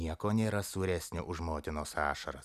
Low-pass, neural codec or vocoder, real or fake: 14.4 kHz; none; real